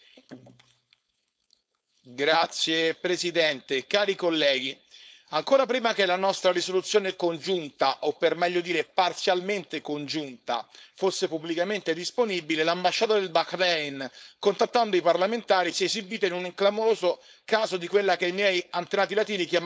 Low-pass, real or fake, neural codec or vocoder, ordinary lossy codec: none; fake; codec, 16 kHz, 4.8 kbps, FACodec; none